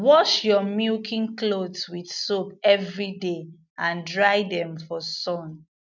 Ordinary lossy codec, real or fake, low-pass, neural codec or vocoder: none; real; 7.2 kHz; none